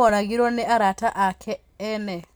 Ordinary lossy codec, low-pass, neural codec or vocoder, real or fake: none; none; none; real